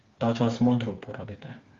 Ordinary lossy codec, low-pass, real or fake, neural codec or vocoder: Opus, 32 kbps; 7.2 kHz; fake; codec, 16 kHz, 4 kbps, FreqCodec, smaller model